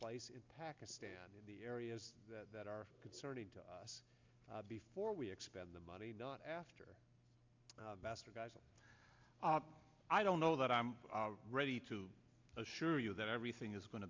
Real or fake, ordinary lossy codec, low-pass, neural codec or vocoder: real; MP3, 64 kbps; 7.2 kHz; none